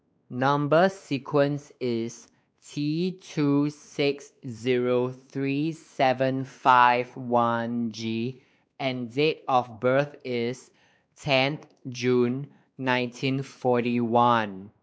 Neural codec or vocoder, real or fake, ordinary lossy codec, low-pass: codec, 16 kHz, 2 kbps, X-Codec, WavLM features, trained on Multilingual LibriSpeech; fake; none; none